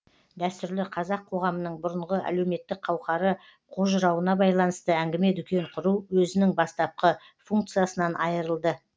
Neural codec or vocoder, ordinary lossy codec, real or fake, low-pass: none; none; real; none